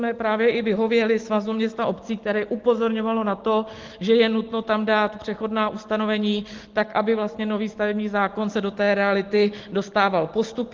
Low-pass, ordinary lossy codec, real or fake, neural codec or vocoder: 7.2 kHz; Opus, 16 kbps; real; none